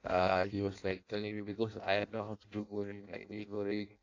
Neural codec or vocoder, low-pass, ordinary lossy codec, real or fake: codec, 16 kHz in and 24 kHz out, 0.6 kbps, FireRedTTS-2 codec; 7.2 kHz; AAC, 48 kbps; fake